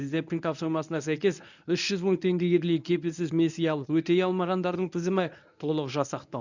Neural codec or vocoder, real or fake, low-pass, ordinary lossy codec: codec, 24 kHz, 0.9 kbps, WavTokenizer, medium speech release version 1; fake; 7.2 kHz; none